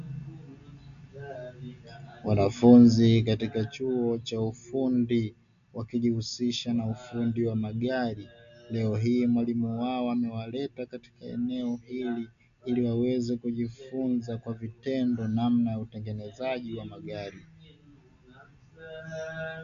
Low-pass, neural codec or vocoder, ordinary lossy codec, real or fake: 7.2 kHz; none; AAC, 96 kbps; real